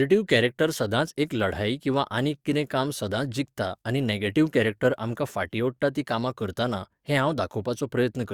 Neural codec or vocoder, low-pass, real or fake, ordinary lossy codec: codec, 44.1 kHz, 7.8 kbps, DAC; 19.8 kHz; fake; Opus, 64 kbps